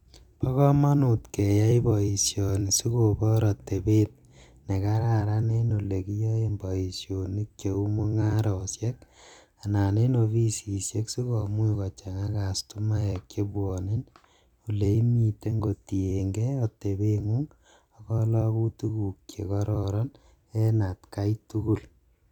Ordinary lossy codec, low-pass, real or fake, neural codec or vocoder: none; 19.8 kHz; fake; vocoder, 44.1 kHz, 128 mel bands every 256 samples, BigVGAN v2